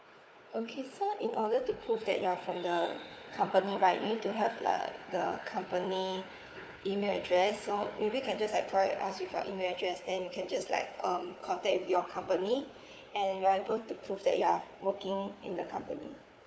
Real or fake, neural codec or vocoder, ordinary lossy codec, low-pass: fake; codec, 16 kHz, 4 kbps, FunCodec, trained on Chinese and English, 50 frames a second; none; none